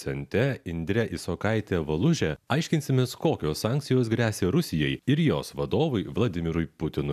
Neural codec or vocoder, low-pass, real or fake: none; 14.4 kHz; real